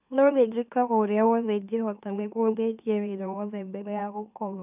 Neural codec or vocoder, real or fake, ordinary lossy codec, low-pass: autoencoder, 44.1 kHz, a latent of 192 numbers a frame, MeloTTS; fake; none; 3.6 kHz